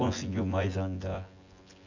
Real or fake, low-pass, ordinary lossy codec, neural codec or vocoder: fake; 7.2 kHz; Opus, 64 kbps; vocoder, 24 kHz, 100 mel bands, Vocos